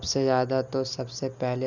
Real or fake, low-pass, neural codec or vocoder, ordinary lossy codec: real; 7.2 kHz; none; none